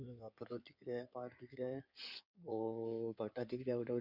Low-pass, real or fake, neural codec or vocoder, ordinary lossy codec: 5.4 kHz; fake; codec, 16 kHz in and 24 kHz out, 2.2 kbps, FireRedTTS-2 codec; none